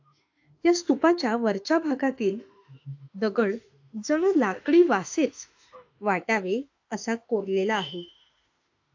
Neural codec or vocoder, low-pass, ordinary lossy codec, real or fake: autoencoder, 48 kHz, 32 numbers a frame, DAC-VAE, trained on Japanese speech; 7.2 kHz; AAC, 48 kbps; fake